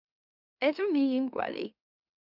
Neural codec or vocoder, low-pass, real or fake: autoencoder, 44.1 kHz, a latent of 192 numbers a frame, MeloTTS; 5.4 kHz; fake